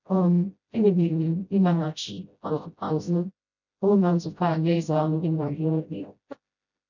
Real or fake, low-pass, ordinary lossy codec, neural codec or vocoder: fake; 7.2 kHz; none; codec, 16 kHz, 0.5 kbps, FreqCodec, smaller model